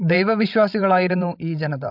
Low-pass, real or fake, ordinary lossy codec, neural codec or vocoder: 5.4 kHz; fake; AAC, 48 kbps; codec, 16 kHz, 16 kbps, FreqCodec, larger model